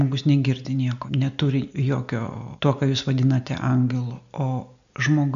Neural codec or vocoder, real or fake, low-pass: none; real; 7.2 kHz